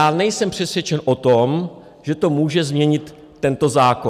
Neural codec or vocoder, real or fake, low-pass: none; real; 14.4 kHz